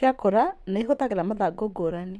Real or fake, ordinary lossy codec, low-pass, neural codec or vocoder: fake; none; none; vocoder, 22.05 kHz, 80 mel bands, WaveNeXt